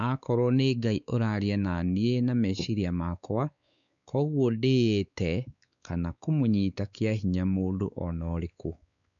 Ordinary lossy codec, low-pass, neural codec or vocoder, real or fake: none; 7.2 kHz; codec, 16 kHz, 4 kbps, X-Codec, WavLM features, trained on Multilingual LibriSpeech; fake